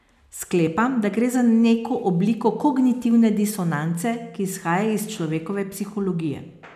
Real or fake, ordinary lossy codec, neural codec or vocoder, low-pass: real; none; none; 14.4 kHz